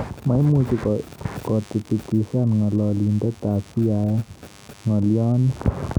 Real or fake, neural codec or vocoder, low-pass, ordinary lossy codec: real; none; none; none